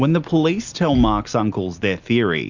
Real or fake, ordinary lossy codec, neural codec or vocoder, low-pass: real; Opus, 64 kbps; none; 7.2 kHz